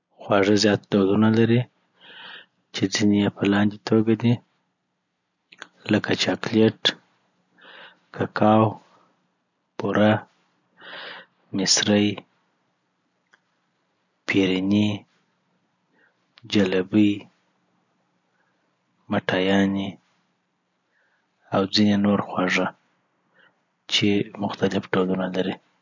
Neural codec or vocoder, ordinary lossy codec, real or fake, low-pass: none; none; real; 7.2 kHz